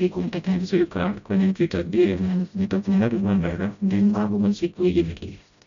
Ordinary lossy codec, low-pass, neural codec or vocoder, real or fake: MP3, 64 kbps; 7.2 kHz; codec, 16 kHz, 0.5 kbps, FreqCodec, smaller model; fake